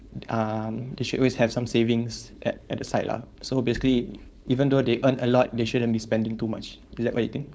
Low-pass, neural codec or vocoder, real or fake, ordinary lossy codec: none; codec, 16 kHz, 4.8 kbps, FACodec; fake; none